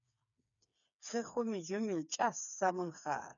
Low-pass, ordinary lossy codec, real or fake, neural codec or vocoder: 7.2 kHz; MP3, 96 kbps; fake; codec, 16 kHz, 4 kbps, FreqCodec, smaller model